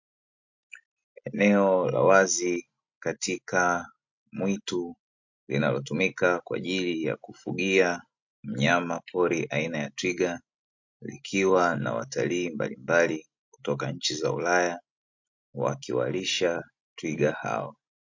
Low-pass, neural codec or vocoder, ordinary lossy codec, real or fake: 7.2 kHz; none; MP3, 48 kbps; real